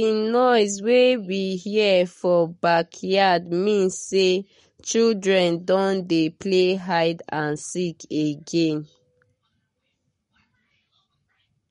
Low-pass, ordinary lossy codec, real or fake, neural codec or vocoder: 19.8 kHz; MP3, 48 kbps; fake; codec, 44.1 kHz, 7.8 kbps, Pupu-Codec